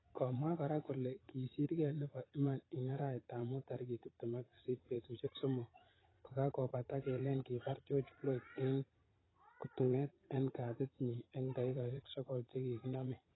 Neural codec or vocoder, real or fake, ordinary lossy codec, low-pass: vocoder, 22.05 kHz, 80 mel bands, Vocos; fake; AAC, 16 kbps; 7.2 kHz